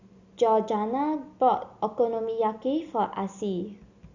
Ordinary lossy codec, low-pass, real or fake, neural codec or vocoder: Opus, 64 kbps; 7.2 kHz; real; none